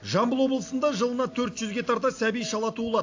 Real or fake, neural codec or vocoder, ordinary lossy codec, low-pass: real; none; AAC, 48 kbps; 7.2 kHz